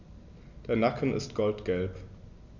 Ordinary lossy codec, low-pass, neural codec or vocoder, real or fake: none; 7.2 kHz; none; real